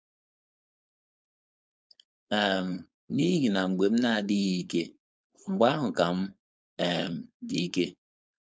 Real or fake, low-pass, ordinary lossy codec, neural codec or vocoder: fake; none; none; codec, 16 kHz, 4.8 kbps, FACodec